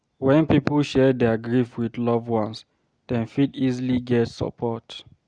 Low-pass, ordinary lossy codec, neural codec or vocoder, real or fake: 9.9 kHz; Opus, 64 kbps; none; real